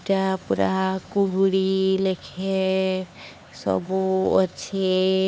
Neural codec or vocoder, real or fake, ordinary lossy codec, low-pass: codec, 16 kHz, 4 kbps, X-Codec, HuBERT features, trained on LibriSpeech; fake; none; none